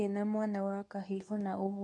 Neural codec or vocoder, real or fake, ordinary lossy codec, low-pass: codec, 24 kHz, 0.9 kbps, WavTokenizer, medium speech release version 2; fake; none; none